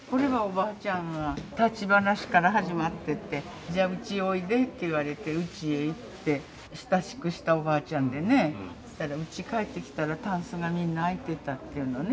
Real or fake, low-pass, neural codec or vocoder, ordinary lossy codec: real; none; none; none